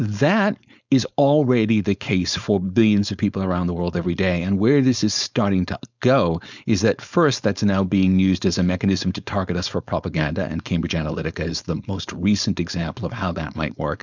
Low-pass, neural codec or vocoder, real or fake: 7.2 kHz; codec, 16 kHz, 4.8 kbps, FACodec; fake